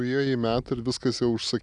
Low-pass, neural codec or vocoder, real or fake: 10.8 kHz; none; real